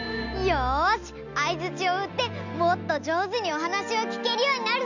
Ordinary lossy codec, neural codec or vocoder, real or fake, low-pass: none; none; real; 7.2 kHz